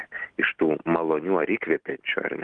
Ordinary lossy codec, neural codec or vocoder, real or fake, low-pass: Opus, 24 kbps; none; real; 9.9 kHz